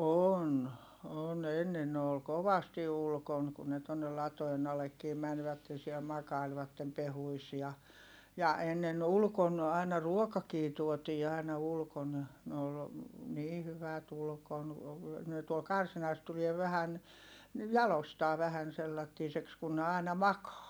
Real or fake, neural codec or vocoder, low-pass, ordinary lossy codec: real; none; none; none